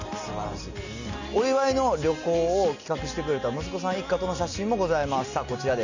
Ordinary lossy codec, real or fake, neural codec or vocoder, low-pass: none; real; none; 7.2 kHz